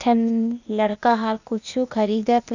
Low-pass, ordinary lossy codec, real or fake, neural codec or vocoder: 7.2 kHz; none; fake; codec, 16 kHz, 0.8 kbps, ZipCodec